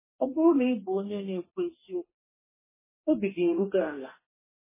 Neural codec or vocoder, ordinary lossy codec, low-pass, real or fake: codec, 44.1 kHz, 2.6 kbps, DAC; MP3, 16 kbps; 3.6 kHz; fake